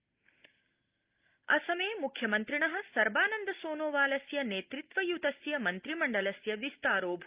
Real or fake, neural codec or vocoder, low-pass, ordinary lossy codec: real; none; 3.6 kHz; Opus, 32 kbps